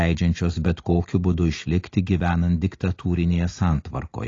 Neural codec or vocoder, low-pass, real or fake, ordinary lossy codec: none; 7.2 kHz; real; AAC, 32 kbps